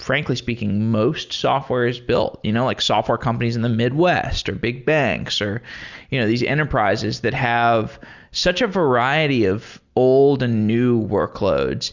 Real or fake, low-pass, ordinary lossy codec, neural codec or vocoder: real; 7.2 kHz; Opus, 64 kbps; none